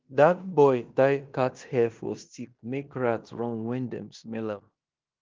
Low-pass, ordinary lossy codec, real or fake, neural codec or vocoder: 7.2 kHz; Opus, 32 kbps; fake; codec, 16 kHz in and 24 kHz out, 0.9 kbps, LongCat-Audio-Codec, fine tuned four codebook decoder